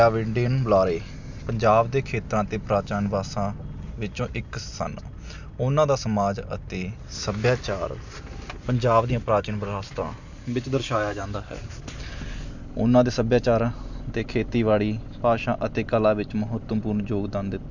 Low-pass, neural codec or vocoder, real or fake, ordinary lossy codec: 7.2 kHz; none; real; none